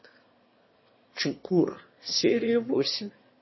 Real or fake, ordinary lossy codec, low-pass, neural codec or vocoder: fake; MP3, 24 kbps; 7.2 kHz; autoencoder, 22.05 kHz, a latent of 192 numbers a frame, VITS, trained on one speaker